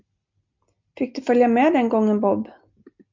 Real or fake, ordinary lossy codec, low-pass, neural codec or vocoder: real; MP3, 64 kbps; 7.2 kHz; none